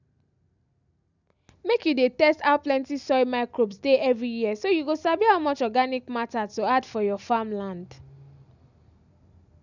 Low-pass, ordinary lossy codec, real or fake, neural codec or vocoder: 7.2 kHz; none; real; none